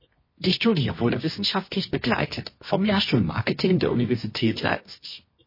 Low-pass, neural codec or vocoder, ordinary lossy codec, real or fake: 5.4 kHz; codec, 24 kHz, 0.9 kbps, WavTokenizer, medium music audio release; MP3, 24 kbps; fake